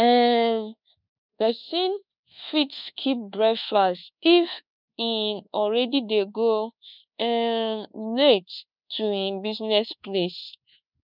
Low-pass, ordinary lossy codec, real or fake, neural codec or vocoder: 5.4 kHz; none; fake; codec, 24 kHz, 1.2 kbps, DualCodec